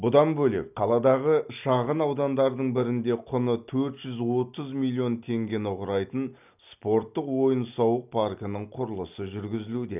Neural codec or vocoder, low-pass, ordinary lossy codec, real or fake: none; 3.6 kHz; none; real